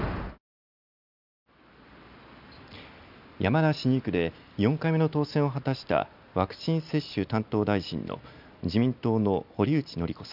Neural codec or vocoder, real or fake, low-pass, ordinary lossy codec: none; real; 5.4 kHz; none